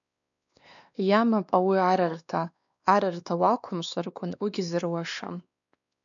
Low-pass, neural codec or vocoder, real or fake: 7.2 kHz; codec, 16 kHz, 2 kbps, X-Codec, WavLM features, trained on Multilingual LibriSpeech; fake